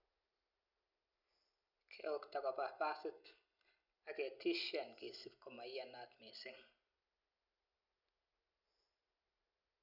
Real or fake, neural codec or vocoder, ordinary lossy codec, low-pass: real; none; none; 5.4 kHz